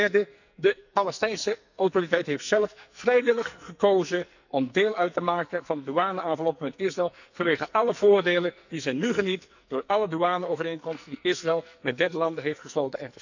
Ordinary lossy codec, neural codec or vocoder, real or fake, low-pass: none; codec, 44.1 kHz, 2.6 kbps, SNAC; fake; 7.2 kHz